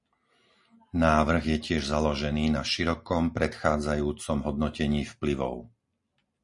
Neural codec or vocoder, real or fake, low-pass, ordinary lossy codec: none; real; 10.8 kHz; MP3, 48 kbps